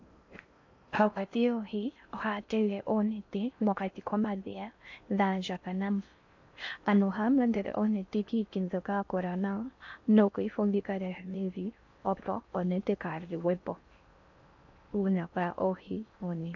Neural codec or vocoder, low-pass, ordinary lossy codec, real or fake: codec, 16 kHz in and 24 kHz out, 0.6 kbps, FocalCodec, streaming, 4096 codes; 7.2 kHz; AAC, 48 kbps; fake